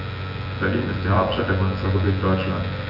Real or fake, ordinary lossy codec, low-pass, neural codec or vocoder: fake; none; 5.4 kHz; vocoder, 24 kHz, 100 mel bands, Vocos